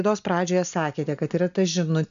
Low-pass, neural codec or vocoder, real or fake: 7.2 kHz; none; real